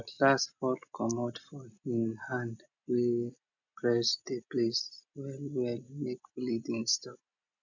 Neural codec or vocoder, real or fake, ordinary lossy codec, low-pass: none; real; none; 7.2 kHz